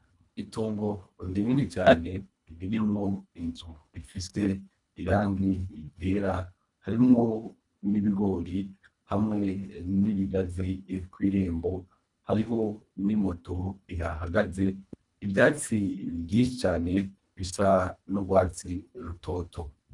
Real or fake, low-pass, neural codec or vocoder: fake; 10.8 kHz; codec, 24 kHz, 1.5 kbps, HILCodec